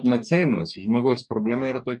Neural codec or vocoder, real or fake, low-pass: codec, 44.1 kHz, 2.6 kbps, DAC; fake; 10.8 kHz